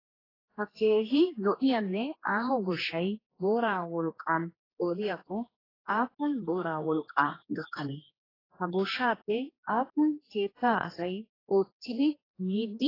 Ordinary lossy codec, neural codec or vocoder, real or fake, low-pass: AAC, 24 kbps; codec, 16 kHz, 2 kbps, X-Codec, HuBERT features, trained on general audio; fake; 5.4 kHz